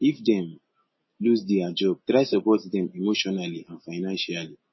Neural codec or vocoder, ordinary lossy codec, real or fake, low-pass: none; MP3, 24 kbps; real; 7.2 kHz